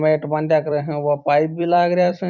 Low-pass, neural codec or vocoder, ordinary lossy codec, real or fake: 7.2 kHz; vocoder, 44.1 kHz, 128 mel bands every 256 samples, BigVGAN v2; none; fake